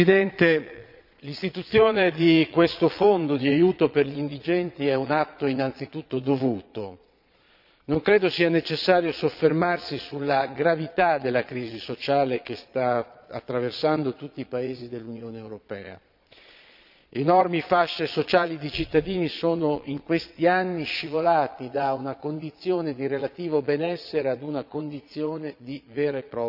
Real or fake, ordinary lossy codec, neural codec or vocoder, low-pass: fake; none; vocoder, 22.05 kHz, 80 mel bands, Vocos; 5.4 kHz